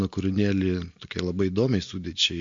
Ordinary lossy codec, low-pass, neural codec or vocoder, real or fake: MP3, 48 kbps; 7.2 kHz; none; real